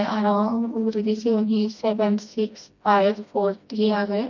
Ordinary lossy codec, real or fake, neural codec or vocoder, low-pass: none; fake; codec, 16 kHz, 1 kbps, FreqCodec, smaller model; 7.2 kHz